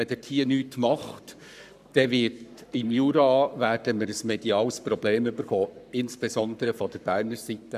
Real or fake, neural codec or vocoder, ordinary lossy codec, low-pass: fake; codec, 44.1 kHz, 7.8 kbps, Pupu-Codec; none; 14.4 kHz